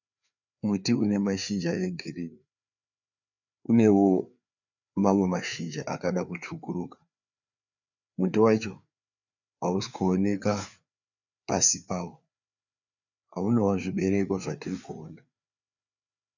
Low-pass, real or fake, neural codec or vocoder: 7.2 kHz; fake; codec, 16 kHz, 4 kbps, FreqCodec, larger model